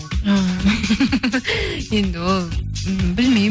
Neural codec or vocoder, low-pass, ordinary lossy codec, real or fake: none; none; none; real